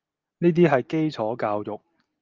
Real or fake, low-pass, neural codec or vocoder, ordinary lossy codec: real; 7.2 kHz; none; Opus, 32 kbps